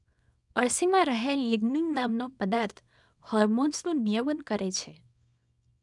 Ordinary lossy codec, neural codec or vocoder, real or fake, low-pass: none; codec, 24 kHz, 0.9 kbps, WavTokenizer, small release; fake; 10.8 kHz